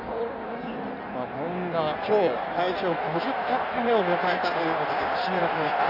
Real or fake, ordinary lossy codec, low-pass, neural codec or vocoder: fake; AAC, 48 kbps; 5.4 kHz; codec, 16 kHz in and 24 kHz out, 1.1 kbps, FireRedTTS-2 codec